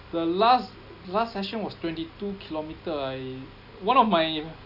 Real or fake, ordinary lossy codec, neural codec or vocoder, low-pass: real; none; none; 5.4 kHz